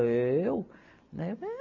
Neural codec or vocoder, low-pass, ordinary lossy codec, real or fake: none; 7.2 kHz; none; real